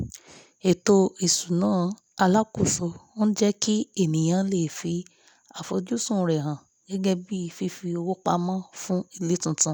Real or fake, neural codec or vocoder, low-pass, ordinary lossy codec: real; none; none; none